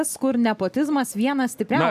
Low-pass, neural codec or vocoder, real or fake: 14.4 kHz; none; real